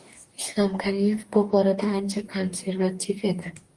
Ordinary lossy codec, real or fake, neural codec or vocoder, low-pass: Opus, 24 kbps; fake; codec, 44.1 kHz, 2.6 kbps, DAC; 10.8 kHz